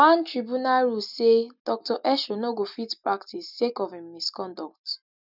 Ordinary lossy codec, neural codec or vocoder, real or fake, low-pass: none; none; real; 5.4 kHz